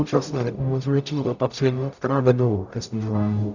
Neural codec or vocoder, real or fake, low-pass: codec, 44.1 kHz, 0.9 kbps, DAC; fake; 7.2 kHz